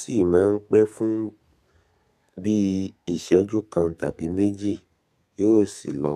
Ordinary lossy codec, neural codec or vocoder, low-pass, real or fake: none; codec, 32 kHz, 1.9 kbps, SNAC; 14.4 kHz; fake